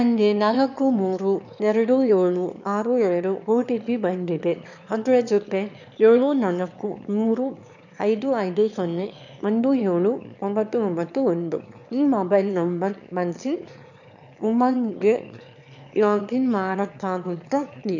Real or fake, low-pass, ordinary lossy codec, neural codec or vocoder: fake; 7.2 kHz; none; autoencoder, 22.05 kHz, a latent of 192 numbers a frame, VITS, trained on one speaker